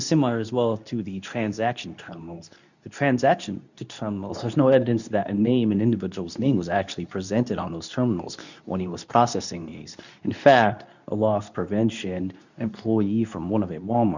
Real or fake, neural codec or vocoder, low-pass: fake; codec, 24 kHz, 0.9 kbps, WavTokenizer, medium speech release version 2; 7.2 kHz